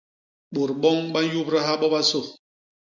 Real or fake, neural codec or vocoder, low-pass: real; none; 7.2 kHz